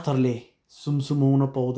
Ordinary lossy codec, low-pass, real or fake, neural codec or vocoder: none; none; real; none